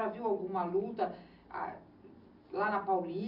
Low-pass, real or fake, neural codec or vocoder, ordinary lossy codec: 5.4 kHz; real; none; Opus, 64 kbps